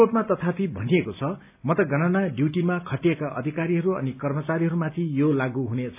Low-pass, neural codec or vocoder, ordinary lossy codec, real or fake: 3.6 kHz; none; AAC, 32 kbps; real